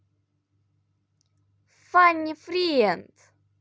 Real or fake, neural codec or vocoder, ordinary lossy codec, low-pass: real; none; none; none